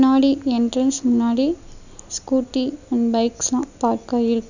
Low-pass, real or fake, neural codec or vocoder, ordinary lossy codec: 7.2 kHz; real; none; none